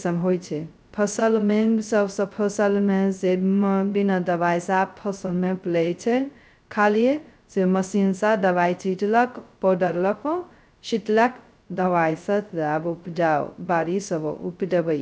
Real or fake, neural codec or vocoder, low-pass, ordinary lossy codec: fake; codec, 16 kHz, 0.2 kbps, FocalCodec; none; none